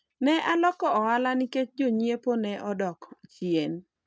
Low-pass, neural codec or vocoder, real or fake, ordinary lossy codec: none; none; real; none